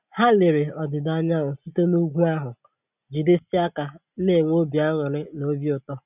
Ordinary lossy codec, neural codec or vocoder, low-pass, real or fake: none; none; 3.6 kHz; real